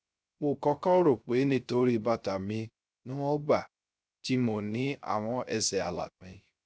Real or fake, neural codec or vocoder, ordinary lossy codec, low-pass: fake; codec, 16 kHz, 0.3 kbps, FocalCodec; none; none